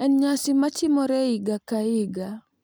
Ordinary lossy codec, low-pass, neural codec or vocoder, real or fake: none; none; none; real